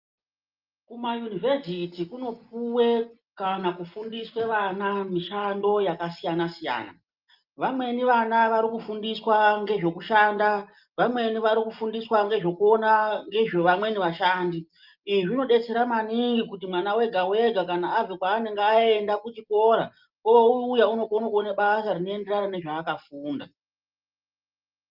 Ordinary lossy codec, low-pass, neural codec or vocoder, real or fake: Opus, 24 kbps; 5.4 kHz; none; real